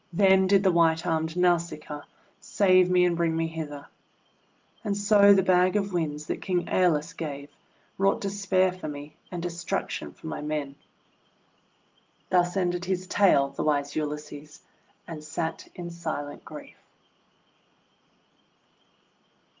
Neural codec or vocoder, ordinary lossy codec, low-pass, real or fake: none; Opus, 32 kbps; 7.2 kHz; real